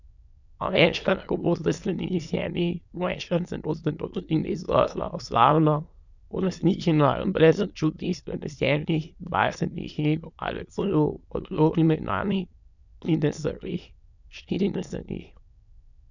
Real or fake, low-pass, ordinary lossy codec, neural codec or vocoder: fake; 7.2 kHz; none; autoencoder, 22.05 kHz, a latent of 192 numbers a frame, VITS, trained on many speakers